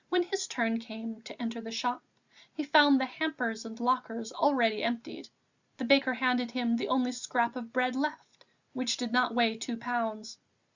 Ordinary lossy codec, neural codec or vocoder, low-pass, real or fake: Opus, 64 kbps; none; 7.2 kHz; real